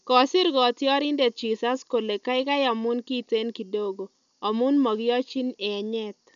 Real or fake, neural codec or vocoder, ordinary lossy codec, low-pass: real; none; none; 7.2 kHz